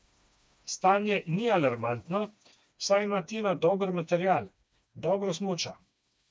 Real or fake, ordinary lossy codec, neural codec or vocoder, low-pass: fake; none; codec, 16 kHz, 2 kbps, FreqCodec, smaller model; none